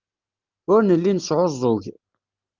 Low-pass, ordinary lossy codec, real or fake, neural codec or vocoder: 7.2 kHz; Opus, 24 kbps; real; none